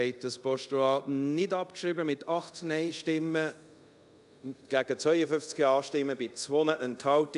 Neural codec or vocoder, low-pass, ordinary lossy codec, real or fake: codec, 24 kHz, 0.5 kbps, DualCodec; 10.8 kHz; none; fake